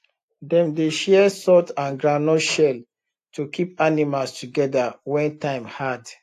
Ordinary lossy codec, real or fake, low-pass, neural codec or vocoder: AAC, 64 kbps; real; 14.4 kHz; none